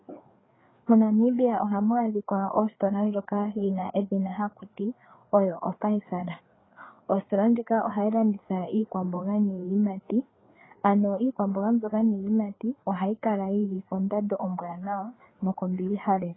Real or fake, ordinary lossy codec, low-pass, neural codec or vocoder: fake; AAC, 16 kbps; 7.2 kHz; codec, 16 kHz, 4 kbps, FreqCodec, larger model